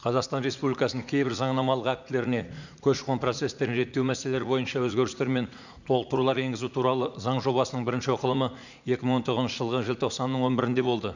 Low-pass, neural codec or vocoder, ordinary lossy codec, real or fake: 7.2 kHz; vocoder, 44.1 kHz, 80 mel bands, Vocos; none; fake